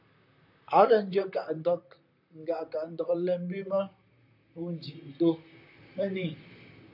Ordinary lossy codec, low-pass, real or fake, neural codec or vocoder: MP3, 48 kbps; 5.4 kHz; fake; vocoder, 44.1 kHz, 128 mel bands, Pupu-Vocoder